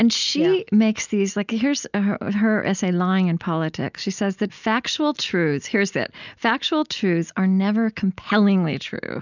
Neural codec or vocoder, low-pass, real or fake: none; 7.2 kHz; real